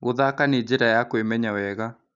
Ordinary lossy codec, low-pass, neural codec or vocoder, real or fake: none; 7.2 kHz; none; real